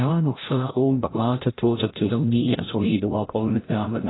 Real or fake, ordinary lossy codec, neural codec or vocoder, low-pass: fake; AAC, 16 kbps; codec, 16 kHz, 0.5 kbps, FreqCodec, larger model; 7.2 kHz